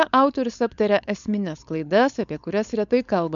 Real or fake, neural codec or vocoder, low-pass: fake; codec, 16 kHz, 4.8 kbps, FACodec; 7.2 kHz